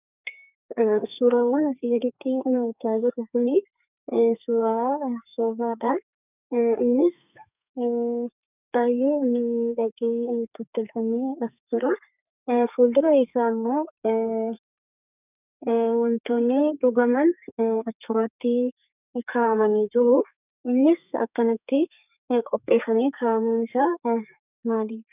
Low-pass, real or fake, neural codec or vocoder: 3.6 kHz; fake; codec, 32 kHz, 1.9 kbps, SNAC